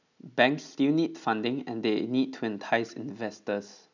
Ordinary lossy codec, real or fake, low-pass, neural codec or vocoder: none; real; 7.2 kHz; none